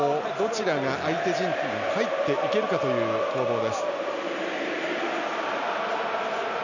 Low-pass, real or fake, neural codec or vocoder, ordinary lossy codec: 7.2 kHz; real; none; none